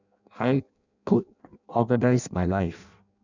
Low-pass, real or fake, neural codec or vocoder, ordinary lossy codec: 7.2 kHz; fake; codec, 16 kHz in and 24 kHz out, 0.6 kbps, FireRedTTS-2 codec; none